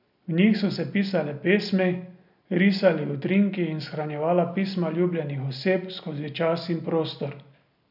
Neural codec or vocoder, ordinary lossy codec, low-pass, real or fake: none; none; 5.4 kHz; real